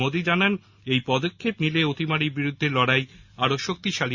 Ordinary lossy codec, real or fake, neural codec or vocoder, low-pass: Opus, 64 kbps; real; none; 7.2 kHz